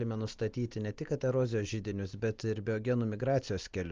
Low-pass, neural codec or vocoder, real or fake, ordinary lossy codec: 7.2 kHz; none; real; Opus, 24 kbps